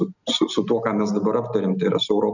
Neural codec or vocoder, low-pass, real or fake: none; 7.2 kHz; real